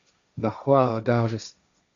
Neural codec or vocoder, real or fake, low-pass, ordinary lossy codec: codec, 16 kHz, 1.1 kbps, Voila-Tokenizer; fake; 7.2 kHz; MP3, 64 kbps